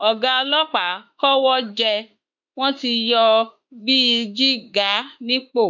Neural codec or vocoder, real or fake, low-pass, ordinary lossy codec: autoencoder, 48 kHz, 32 numbers a frame, DAC-VAE, trained on Japanese speech; fake; 7.2 kHz; none